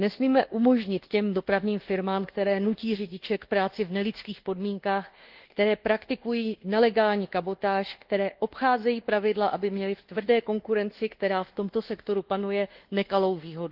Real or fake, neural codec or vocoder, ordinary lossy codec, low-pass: fake; codec, 24 kHz, 1.2 kbps, DualCodec; Opus, 16 kbps; 5.4 kHz